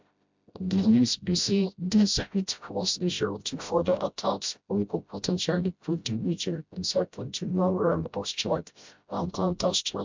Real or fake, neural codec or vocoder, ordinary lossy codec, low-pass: fake; codec, 16 kHz, 0.5 kbps, FreqCodec, smaller model; MP3, 64 kbps; 7.2 kHz